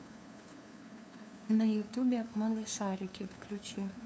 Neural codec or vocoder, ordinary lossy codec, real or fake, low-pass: codec, 16 kHz, 2 kbps, FunCodec, trained on LibriTTS, 25 frames a second; none; fake; none